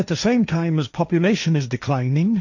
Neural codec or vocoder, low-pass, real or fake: codec, 16 kHz, 1.1 kbps, Voila-Tokenizer; 7.2 kHz; fake